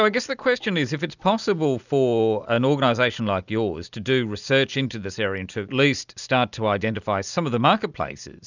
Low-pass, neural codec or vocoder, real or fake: 7.2 kHz; none; real